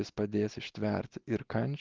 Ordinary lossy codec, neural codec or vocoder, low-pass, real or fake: Opus, 16 kbps; none; 7.2 kHz; real